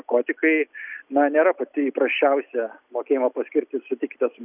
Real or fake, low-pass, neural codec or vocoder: real; 3.6 kHz; none